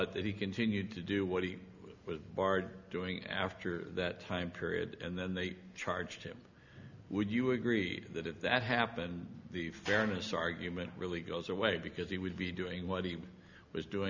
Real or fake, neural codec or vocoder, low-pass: real; none; 7.2 kHz